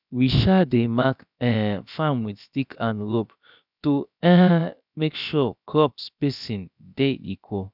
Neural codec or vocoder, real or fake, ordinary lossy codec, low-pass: codec, 16 kHz, about 1 kbps, DyCAST, with the encoder's durations; fake; none; 5.4 kHz